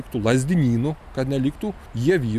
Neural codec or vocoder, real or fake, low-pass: none; real; 14.4 kHz